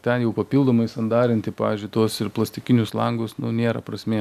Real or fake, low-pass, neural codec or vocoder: real; 14.4 kHz; none